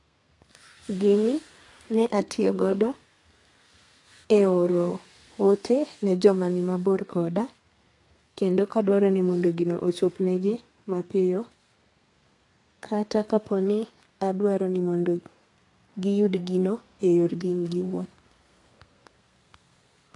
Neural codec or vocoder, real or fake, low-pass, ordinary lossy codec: codec, 32 kHz, 1.9 kbps, SNAC; fake; 10.8 kHz; AAC, 48 kbps